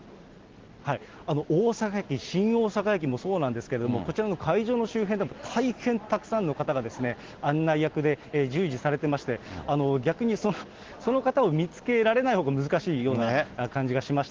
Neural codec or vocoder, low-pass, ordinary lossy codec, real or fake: none; 7.2 kHz; Opus, 16 kbps; real